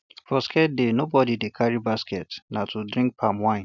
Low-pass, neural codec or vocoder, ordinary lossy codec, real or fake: 7.2 kHz; none; none; real